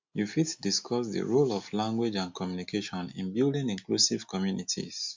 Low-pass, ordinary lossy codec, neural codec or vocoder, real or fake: 7.2 kHz; MP3, 64 kbps; none; real